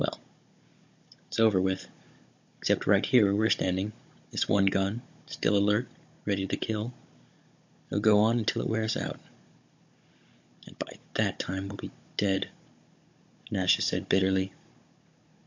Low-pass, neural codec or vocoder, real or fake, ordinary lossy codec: 7.2 kHz; codec, 16 kHz, 16 kbps, FunCodec, trained on Chinese and English, 50 frames a second; fake; MP3, 48 kbps